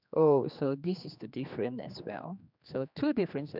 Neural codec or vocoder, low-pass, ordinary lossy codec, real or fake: codec, 16 kHz, 2 kbps, X-Codec, HuBERT features, trained on general audio; 5.4 kHz; none; fake